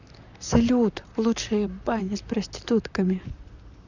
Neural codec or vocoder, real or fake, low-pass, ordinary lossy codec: vocoder, 44.1 kHz, 128 mel bands, Pupu-Vocoder; fake; 7.2 kHz; none